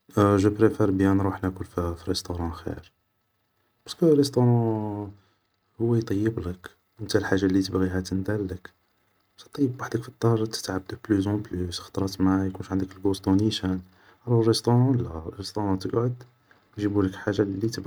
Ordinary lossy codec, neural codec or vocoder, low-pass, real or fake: none; none; none; real